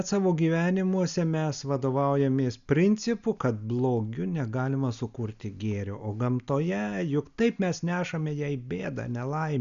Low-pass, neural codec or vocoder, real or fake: 7.2 kHz; none; real